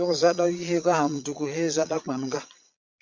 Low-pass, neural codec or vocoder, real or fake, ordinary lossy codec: 7.2 kHz; vocoder, 22.05 kHz, 80 mel bands, Vocos; fake; MP3, 64 kbps